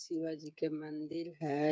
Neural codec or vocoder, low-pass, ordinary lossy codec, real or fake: codec, 16 kHz, 8 kbps, FreqCodec, smaller model; none; none; fake